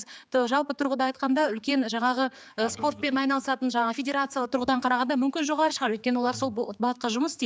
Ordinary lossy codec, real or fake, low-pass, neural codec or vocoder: none; fake; none; codec, 16 kHz, 4 kbps, X-Codec, HuBERT features, trained on general audio